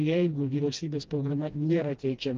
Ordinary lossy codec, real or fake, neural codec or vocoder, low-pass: Opus, 32 kbps; fake; codec, 16 kHz, 1 kbps, FreqCodec, smaller model; 7.2 kHz